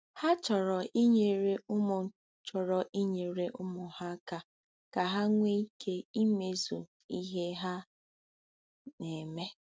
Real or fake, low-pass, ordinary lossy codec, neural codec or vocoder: real; none; none; none